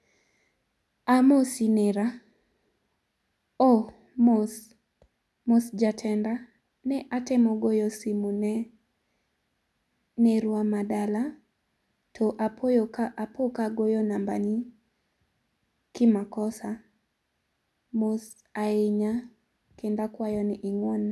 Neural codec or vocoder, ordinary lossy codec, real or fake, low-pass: none; none; real; none